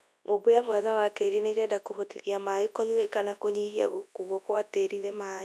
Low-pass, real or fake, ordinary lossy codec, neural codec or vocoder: none; fake; none; codec, 24 kHz, 0.9 kbps, WavTokenizer, large speech release